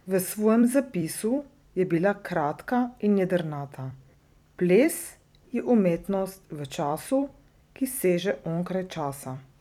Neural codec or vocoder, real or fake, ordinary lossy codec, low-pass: vocoder, 44.1 kHz, 128 mel bands every 256 samples, BigVGAN v2; fake; none; 19.8 kHz